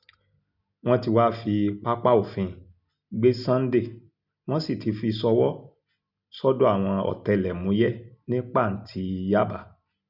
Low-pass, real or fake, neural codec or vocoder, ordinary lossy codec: 5.4 kHz; real; none; none